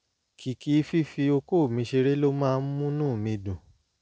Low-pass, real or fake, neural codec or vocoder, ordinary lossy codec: none; real; none; none